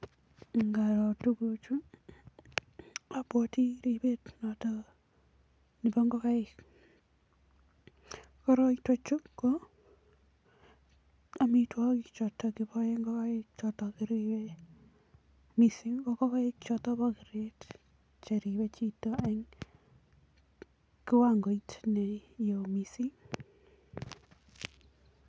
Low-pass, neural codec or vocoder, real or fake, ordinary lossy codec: none; none; real; none